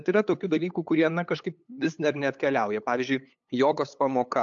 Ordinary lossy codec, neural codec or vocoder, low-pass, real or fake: AAC, 64 kbps; codec, 16 kHz, 8 kbps, FunCodec, trained on LibriTTS, 25 frames a second; 7.2 kHz; fake